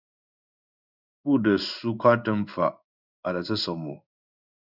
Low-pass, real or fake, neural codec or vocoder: 5.4 kHz; fake; codec, 16 kHz in and 24 kHz out, 1 kbps, XY-Tokenizer